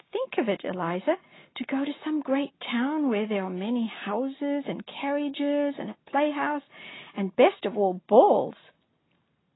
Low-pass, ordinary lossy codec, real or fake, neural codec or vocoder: 7.2 kHz; AAC, 16 kbps; real; none